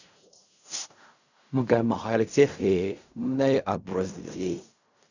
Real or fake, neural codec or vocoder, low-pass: fake; codec, 16 kHz in and 24 kHz out, 0.4 kbps, LongCat-Audio-Codec, fine tuned four codebook decoder; 7.2 kHz